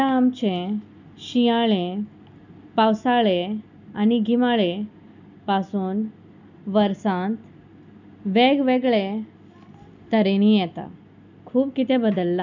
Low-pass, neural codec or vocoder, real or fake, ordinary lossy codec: 7.2 kHz; none; real; none